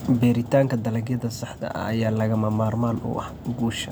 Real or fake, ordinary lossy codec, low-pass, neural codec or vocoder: real; none; none; none